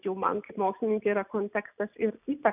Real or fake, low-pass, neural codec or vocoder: real; 3.6 kHz; none